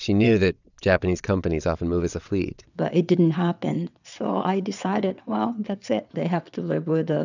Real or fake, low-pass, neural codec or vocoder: fake; 7.2 kHz; vocoder, 22.05 kHz, 80 mel bands, WaveNeXt